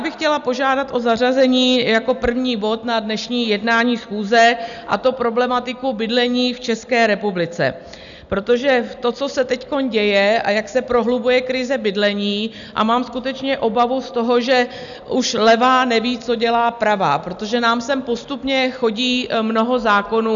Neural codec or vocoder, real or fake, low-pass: none; real; 7.2 kHz